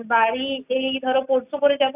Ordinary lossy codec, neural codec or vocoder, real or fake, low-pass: none; none; real; 3.6 kHz